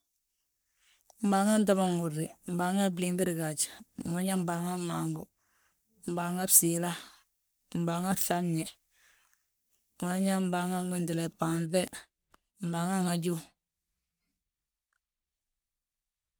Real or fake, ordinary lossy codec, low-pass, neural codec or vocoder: fake; none; none; codec, 44.1 kHz, 3.4 kbps, Pupu-Codec